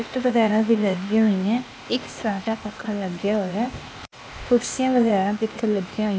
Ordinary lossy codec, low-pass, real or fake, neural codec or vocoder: none; none; fake; codec, 16 kHz, 0.8 kbps, ZipCodec